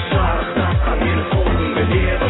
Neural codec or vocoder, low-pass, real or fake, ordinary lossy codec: none; 7.2 kHz; real; AAC, 16 kbps